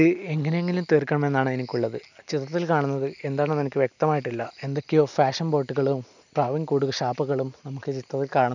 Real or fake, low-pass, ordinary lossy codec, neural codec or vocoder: real; 7.2 kHz; none; none